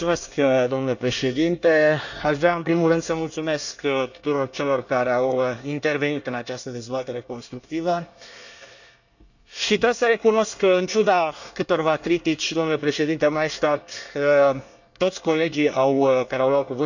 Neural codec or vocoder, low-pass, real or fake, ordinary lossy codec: codec, 24 kHz, 1 kbps, SNAC; 7.2 kHz; fake; none